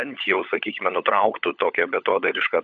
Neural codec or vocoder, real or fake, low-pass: codec, 16 kHz, 16 kbps, FunCodec, trained on LibriTTS, 50 frames a second; fake; 7.2 kHz